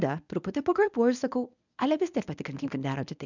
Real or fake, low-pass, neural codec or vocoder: fake; 7.2 kHz; codec, 24 kHz, 0.9 kbps, WavTokenizer, medium speech release version 1